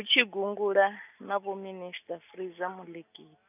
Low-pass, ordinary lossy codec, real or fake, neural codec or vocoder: 3.6 kHz; none; real; none